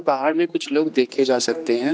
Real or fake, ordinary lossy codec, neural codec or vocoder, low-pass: fake; none; codec, 16 kHz, 2 kbps, X-Codec, HuBERT features, trained on general audio; none